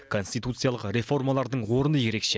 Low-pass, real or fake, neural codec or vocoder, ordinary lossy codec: none; real; none; none